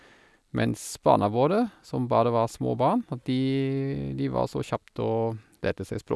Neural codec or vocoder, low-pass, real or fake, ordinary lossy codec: none; none; real; none